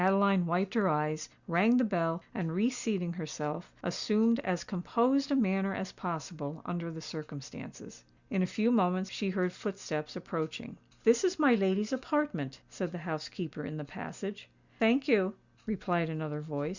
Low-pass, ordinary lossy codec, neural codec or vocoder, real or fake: 7.2 kHz; Opus, 64 kbps; none; real